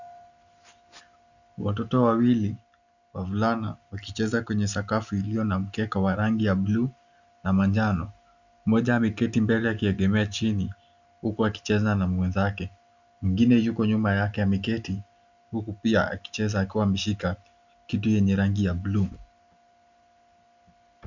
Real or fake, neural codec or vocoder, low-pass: real; none; 7.2 kHz